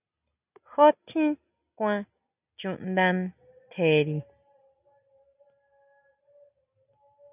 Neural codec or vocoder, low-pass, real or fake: none; 3.6 kHz; real